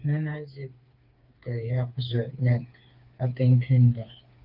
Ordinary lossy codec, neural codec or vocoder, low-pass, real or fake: Opus, 24 kbps; codec, 16 kHz, 4 kbps, FreqCodec, smaller model; 5.4 kHz; fake